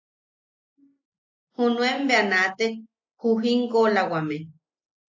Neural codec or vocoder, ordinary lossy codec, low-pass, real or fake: none; AAC, 48 kbps; 7.2 kHz; real